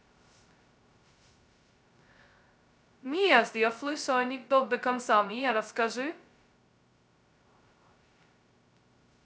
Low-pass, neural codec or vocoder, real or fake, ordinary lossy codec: none; codec, 16 kHz, 0.2 kbps, FocalCodec; fake; none